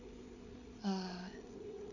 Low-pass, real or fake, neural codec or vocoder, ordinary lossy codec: 7.2 kHz; fake; codec, 16 kHz, 8 kbps, FreqCodec, larger model; none